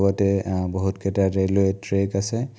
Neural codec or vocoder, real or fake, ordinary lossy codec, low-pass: none; real; none; none